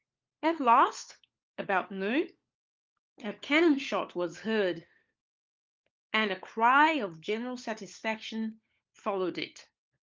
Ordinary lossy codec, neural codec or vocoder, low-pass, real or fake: Opus, 24 kbps; codec, 16 kHz, 4 kbps, FunCodec, trained on LibriTTS, 50 frames a second; 7.2 kHz; fake